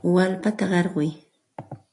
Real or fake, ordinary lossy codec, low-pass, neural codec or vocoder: real; AAC, 64 kbps; 10.8 kHz; none